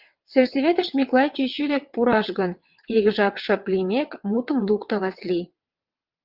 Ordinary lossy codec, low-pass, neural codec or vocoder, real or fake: Opus, 32 kbps; 5.4 kHz; vocoder, 22.05 kHz, 80 mel bands, WaveNeXt; fake